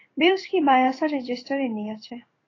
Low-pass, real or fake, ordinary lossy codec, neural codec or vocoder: 7.2 kHz; fake; AAC, 32 kbps; codec, 16 kHz in and 24 kHz out, 1 kbps, XY-Tokenizer